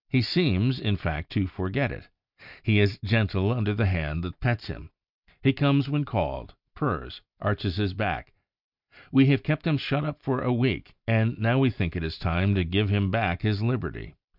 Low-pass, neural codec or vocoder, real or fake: 5.4 kHz; none; real